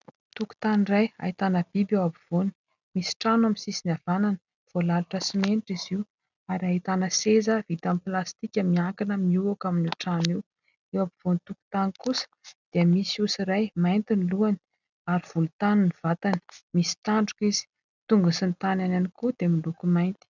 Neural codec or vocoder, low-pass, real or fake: none; 7.2 kHz; real